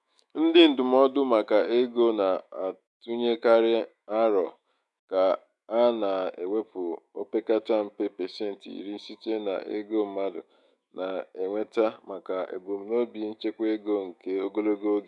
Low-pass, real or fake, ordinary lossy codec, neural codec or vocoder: 10.8 kHz; fake; none; autoencoder, 48 kHz, 128 numbers a frame, DAC-VAE, trained on Japanese speech